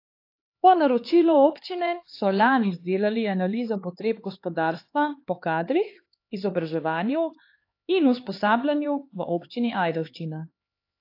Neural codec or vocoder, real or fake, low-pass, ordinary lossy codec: codec, 16 kHz, 4 kbps, X-Codec, HuBERT features, trained on LibriSpeech; fake; 5.4 kHz; AAC, 32 kbps